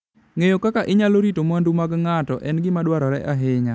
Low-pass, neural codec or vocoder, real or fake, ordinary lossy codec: none; none; real; none